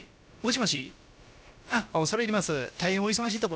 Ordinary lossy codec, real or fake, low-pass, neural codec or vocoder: none; fake; none; codec, 16 kHz, about 1 kbps, DyCAST, with the encoder's durations